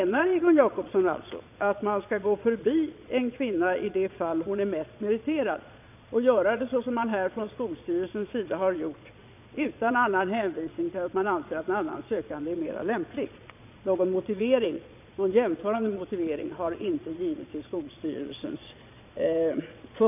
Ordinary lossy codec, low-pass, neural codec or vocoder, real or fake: none; 3.6 kHz; vocoder, 22.05 kHz, 80 mel bands, Vocos; fake